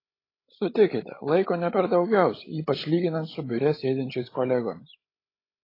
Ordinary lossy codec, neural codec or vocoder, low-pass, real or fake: AAC, 24 kbps; codec, 16 kHz, 16 kbps, FreqCodec, larger model; 5.4 kHz; fake